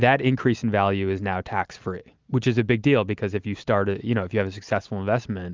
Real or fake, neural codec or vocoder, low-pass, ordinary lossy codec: real; none; 7.2 kHz; Opus, 24 kbps